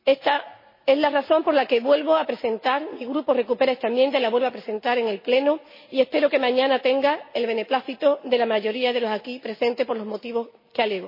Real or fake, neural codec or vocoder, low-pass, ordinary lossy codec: real; none; 5.4 kHz; MP3, 24 kbps